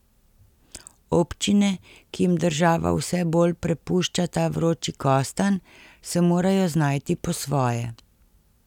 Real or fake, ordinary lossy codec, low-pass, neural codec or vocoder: real; none; 19.8 kHz; none